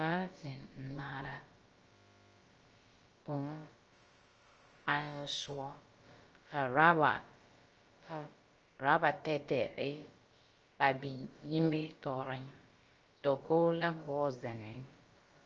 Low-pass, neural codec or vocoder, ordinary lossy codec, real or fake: 7.2 kHz; codec, 16 kHz, about 1 kbps, DyCAST, with the encoder's durations; Opus, 24 kbps; fake